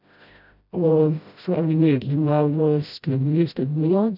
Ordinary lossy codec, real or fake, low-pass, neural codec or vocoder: none; fake; 5.4 kHz; codec, 16 kHz, 0.5 kbps, FreqCodec, smaller model